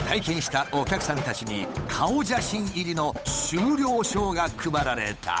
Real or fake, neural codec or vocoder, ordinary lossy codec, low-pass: fake; codec, 16 kHz, 8 kbps, FunCodec, trained on Chinese and English, 25 frames a second; none; none